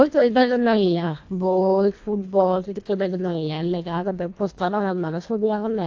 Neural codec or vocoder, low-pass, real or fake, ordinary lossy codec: codec, 24 kHz, 1.5 kbps, HILCodec; 7.2 kHz; fake; AAC, 48 kbps